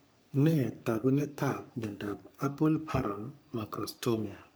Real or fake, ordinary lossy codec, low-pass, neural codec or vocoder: fake; none; none; codec, 44.1 kHz, 3.4 kbps, Pupu-Codec